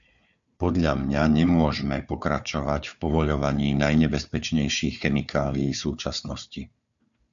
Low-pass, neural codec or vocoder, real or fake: 7.2 kHz; codec, 16 kHz, 4 kbps, FunCodec, trained on Chinese and English, 50 frames a second; fake